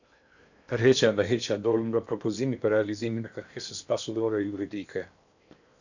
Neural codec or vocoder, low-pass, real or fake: codec, 16 kHz in and 24 kHz out, 0.8 kbps, FocalCodec, streaming, 65536 codes; 7.2 kHz; fake